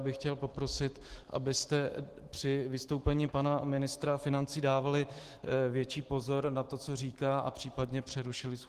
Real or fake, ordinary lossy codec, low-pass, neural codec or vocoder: fake; Opus, 16 kbps; 14.4 kHz; autoencoder, 48 kHz, 128 numbers a frame, DAC-VAE, trained on Japanese speech